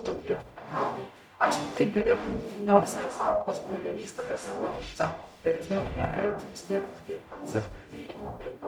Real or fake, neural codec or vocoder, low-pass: fake; codec, 44.1 kHz, 0.9 kbps, DAC; 19.8 kHz